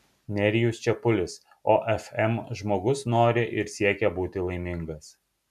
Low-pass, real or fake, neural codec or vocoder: 14.4 kHz; real; none